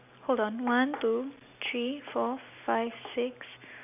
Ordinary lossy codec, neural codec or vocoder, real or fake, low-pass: none; none; real; 3.6 kHz